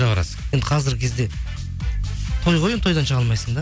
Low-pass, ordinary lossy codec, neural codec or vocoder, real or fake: none; none; none; real